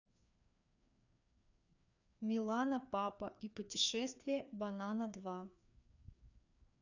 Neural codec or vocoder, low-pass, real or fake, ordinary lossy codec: codec, 16 kHz, 2 kbps, FreqCodec, larger model; 7.2 kHz; fake; none